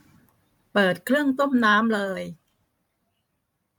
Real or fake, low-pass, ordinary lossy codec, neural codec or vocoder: fake; 19.8 kHz; MP3, 96 kbps; vocoder, 44.1 kHz, 128 mel bands every 512 samples, BigVGAN v2